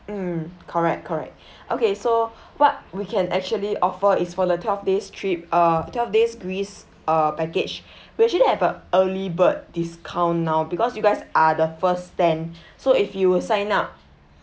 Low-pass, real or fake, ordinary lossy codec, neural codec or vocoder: none; real; none; none